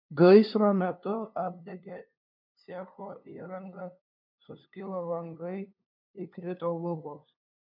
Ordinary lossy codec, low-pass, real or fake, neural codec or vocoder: MP3, 48 kbps; 5.4 kHz; fake; codec, 16 kHz, 2 kbps, FunCodec, trained on LibriTTS, 25 frames a second